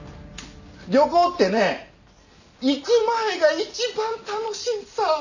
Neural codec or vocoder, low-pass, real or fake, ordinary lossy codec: none; 7.2 kHz; real; none